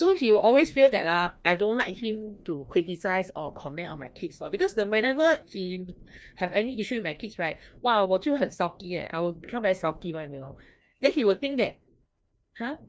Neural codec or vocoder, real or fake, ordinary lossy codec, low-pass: codec, 16 kHz, 1 kbps, FreqCodec, larger model; fake; none; none